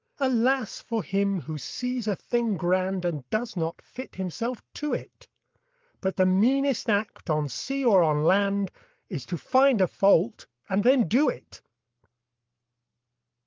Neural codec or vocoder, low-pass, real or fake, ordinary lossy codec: codec, 44.1 kHz, 7.8 kbps, Pupu-Codec; 7.2 kHz; fake; Opus, 24 kbps